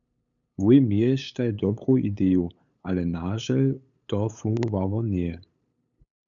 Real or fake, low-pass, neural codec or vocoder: fake; 7.2 kHz; codec, 16 kHz, 8 kbps, FunCodec, trained on LibriTTS, 25 frames a second